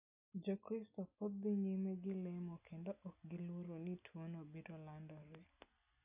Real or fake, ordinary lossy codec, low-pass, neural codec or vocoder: real; none; 3.6 kHz; none